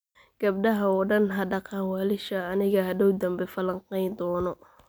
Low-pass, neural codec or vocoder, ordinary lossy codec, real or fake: none; none; none; real